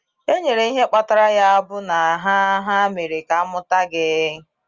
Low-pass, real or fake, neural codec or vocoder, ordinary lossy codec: 7.2 kHz; real; none; Opus, 32 kbps